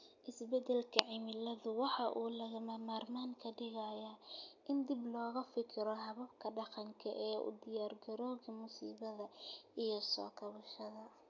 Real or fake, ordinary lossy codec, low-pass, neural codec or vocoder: real; none; 7.2 kHz; none